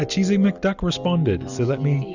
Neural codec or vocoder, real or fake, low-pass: none; real; 7.2 kHz